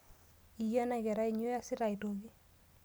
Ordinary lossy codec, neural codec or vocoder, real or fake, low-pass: none; none; real; none